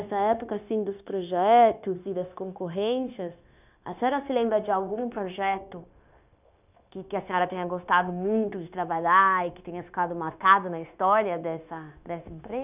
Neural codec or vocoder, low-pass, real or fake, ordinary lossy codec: codec, 16 kHz, 0.9 kbps, LongCat-Audio-Codec; 3.6 kHz; fake; none